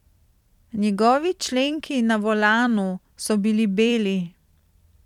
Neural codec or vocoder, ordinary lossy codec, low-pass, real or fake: vocoder, 44.1 kHz, 128 mel bands every 256 samples, BigVGAN v2; none; 19.8 kHz; fake